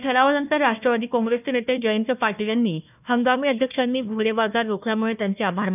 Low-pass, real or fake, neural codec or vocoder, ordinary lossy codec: 3.6 kHz; fake; codec, 16 kHz, 1 kbps, FunCodec, trained on Chinese and English, 50 frames a second; none